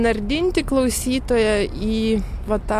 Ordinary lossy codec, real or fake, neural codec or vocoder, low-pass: AAC, 64 kbps; real; none; 14.4 kHz